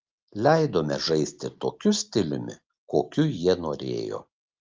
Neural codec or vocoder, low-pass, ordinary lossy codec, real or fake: none; 7.2 kHz; Opus, 32 kbps; real